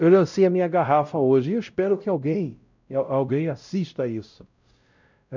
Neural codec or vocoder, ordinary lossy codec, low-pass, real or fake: codec, 16 kHz, 0.5 kbps, X-Codec, WavLM features, trained on Multilingual LibriSpeech; none; 7.2 kHz; fake